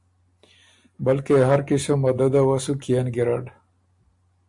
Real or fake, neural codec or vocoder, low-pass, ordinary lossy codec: real; none; 10.8 kHz; AAC, 64 kbps